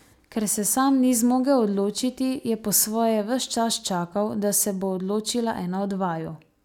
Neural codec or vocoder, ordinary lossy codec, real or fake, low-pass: none; none; real; 19.8 kHz